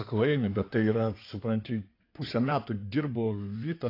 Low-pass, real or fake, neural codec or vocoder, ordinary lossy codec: 5.4 kHz; fake; codec, 16 kHz in and 24 kHz out, 2.2 kbps, FireRedTTS-2 codec; AAC, 32 kbps